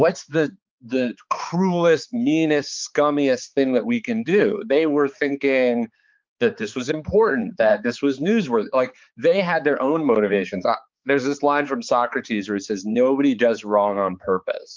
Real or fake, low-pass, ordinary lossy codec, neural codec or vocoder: fake; 7.2 kHz; Opus, 24 kbps; codec, 16 kHz, 4 kbps, X-Codec, HuBERT features, trained on balanced general audio